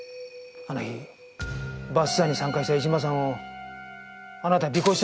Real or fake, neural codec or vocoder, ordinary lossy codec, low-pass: real; none; none; none